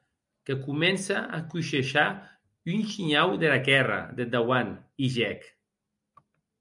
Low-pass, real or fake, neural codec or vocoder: 10.8 kHz; real; none